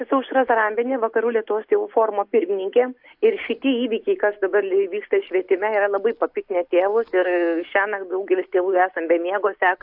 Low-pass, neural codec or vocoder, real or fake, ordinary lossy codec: 5.4 kHz; none; real; AAC, 48 kbps